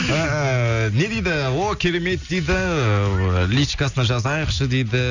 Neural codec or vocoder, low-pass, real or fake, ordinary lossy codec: none; 7.2 kHz; real; none